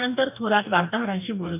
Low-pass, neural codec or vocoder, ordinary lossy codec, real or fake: 3.6 kHz; codec, 44.1 kHz, 2.6 kbps, DAC; none; fake